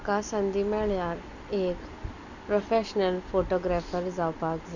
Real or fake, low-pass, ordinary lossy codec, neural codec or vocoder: real; 7.2 kHz; none; none